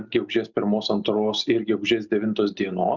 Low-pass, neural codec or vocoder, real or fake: 7.2 kHz; none; real